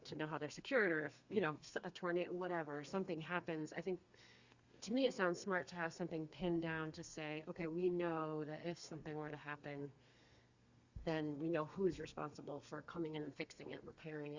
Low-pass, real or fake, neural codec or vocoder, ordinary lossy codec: 7.2 kHz; fake; codec, 32 kHz, 1.9 kbps, SNAC; Opus, 64 kbps